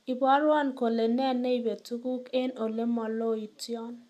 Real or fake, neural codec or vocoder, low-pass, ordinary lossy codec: real; none; 14.4 kHz; none